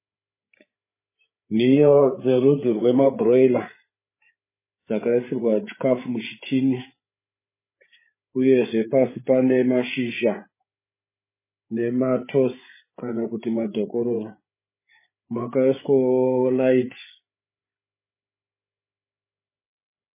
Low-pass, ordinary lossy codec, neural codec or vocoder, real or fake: 3.6 kHz; MP3, 16 kbps; codec, 16 kHz, 8 kbps, FreqCodec, larger model; fake